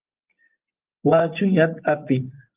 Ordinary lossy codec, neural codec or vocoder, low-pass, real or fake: Opus, 32 kbps; vocoder, 24 kHz, 100 mel bands, Vocos; 3.6 kHz; fake